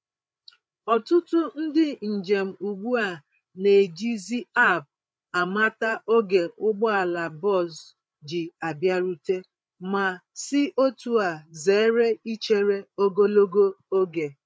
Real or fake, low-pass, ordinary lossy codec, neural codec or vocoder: fake; none; none; codec, 16 kHz, 8 kbps, FreqCodec, larger model